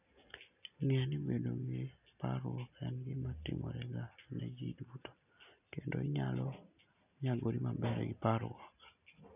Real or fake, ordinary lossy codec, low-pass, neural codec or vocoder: real; none; 3.6 kHz; none